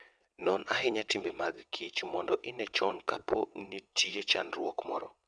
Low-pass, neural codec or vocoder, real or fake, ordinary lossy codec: 9.9 kHz; vocoder, 22.05 kHz, 80 mel bands, WaveNeXt; fake; none